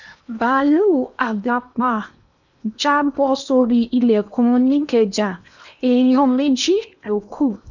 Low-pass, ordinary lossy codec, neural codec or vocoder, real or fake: 7.2 kHz; none; codec, 16 kHz in and 24 kHz out, 0.8 kbps, FocalCodec, streaming, 65536 codes; fake